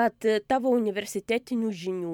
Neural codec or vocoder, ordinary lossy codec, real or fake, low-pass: vocoder, 44.1 kHz, 128 mel bands every 512 samples, BigVGAN v2; MP3, 96 kbps; fake; 19.8 kHz